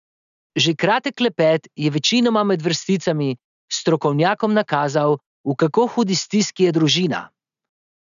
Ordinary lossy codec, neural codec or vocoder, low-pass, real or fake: none; none; 7.2 kHz; real